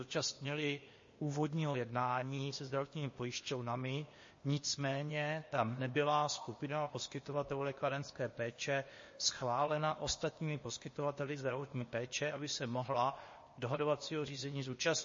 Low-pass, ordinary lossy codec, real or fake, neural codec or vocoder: 7.2 kHz; MP3, 32 kbps; fake; codec, 16 kHz, 0.8 kbps, ZipCodec